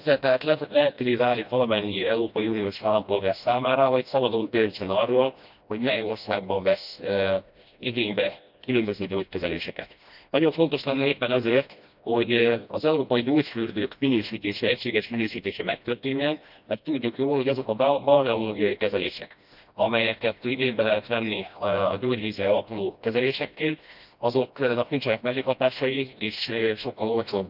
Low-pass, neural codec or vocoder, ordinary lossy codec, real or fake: 5.4 kHz; codec, 16 kHz, 1 kbps, FreqCodec, smaller model; none; fake